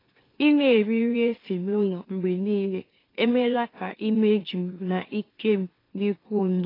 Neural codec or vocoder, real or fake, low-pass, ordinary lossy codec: autoencoder, 44.1 kHz, a latent of 192 numbers a frame, MeloTTS; fake; 5.4 kHz; AAC, 24 kbps